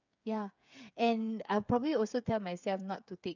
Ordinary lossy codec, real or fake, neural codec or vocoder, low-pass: none; fake; codec, 16 kHz, 8 kbps, FreqCodec, smaller model; 7.2 kHz